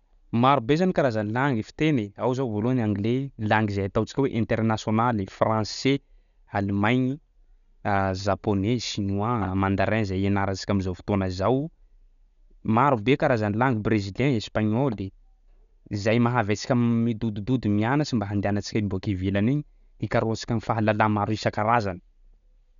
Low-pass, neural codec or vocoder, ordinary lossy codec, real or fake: 7.2 kHz; none; none; real